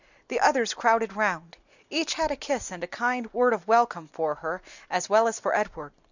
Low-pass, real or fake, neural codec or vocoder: 7.2 kHz; real; none